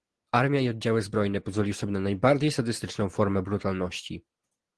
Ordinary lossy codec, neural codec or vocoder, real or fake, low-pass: Opus, 16 kbps; none; real; 10.8 kHz